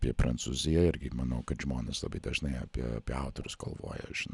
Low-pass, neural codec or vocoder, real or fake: 10.8 kHz; none; real